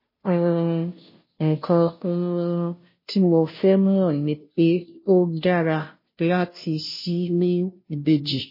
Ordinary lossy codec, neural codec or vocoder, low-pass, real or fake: MP3, 24 kbps; codec, 16 kHz, 0.5 kbps, FunCodec, trained on Chinese and English, 25 frames a second; 5.4 kHz; fake